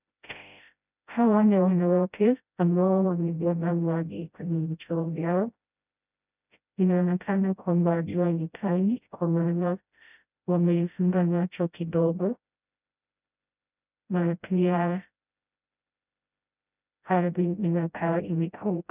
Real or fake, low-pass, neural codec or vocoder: fake; 3.6 kHz; codec, 16 kHz, 0.5 kbps, FreqCodec, smaller model